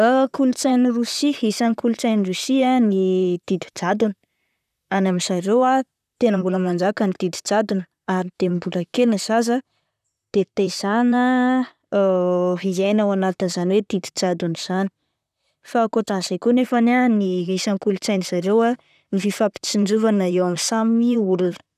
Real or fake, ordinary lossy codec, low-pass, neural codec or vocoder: fake; none; 14.4 kHz; vocoder, 44.1 kHz, 128 mel bands, Pupu-Vocoder